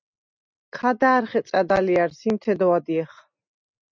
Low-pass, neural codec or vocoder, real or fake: 7.2 kHz; none; real